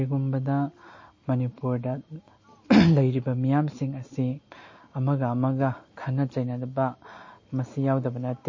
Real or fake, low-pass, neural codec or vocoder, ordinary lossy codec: real; 7.2 kHz; none; MP3, 32 kbps